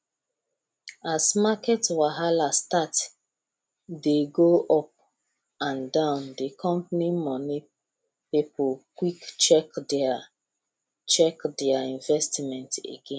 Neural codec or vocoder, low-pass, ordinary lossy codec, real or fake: none; none; none; real